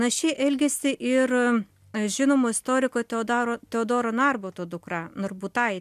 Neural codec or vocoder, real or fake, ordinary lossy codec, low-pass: none; real; MP3, 96 kbps; 14.4 kHz